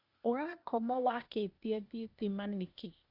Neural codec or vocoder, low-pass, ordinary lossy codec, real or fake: codec, 16 kHz, 0.8 kbps, ZipCodec; 5.4 kHz; Opus, 64 kbps; fake